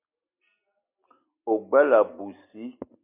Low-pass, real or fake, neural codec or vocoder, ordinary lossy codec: 3.6 kHz; real; none; MP3, 32 kbps